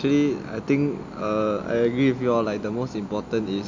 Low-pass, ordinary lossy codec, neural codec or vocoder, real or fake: 7.2 kHz; MP3, 48 kbps; none; real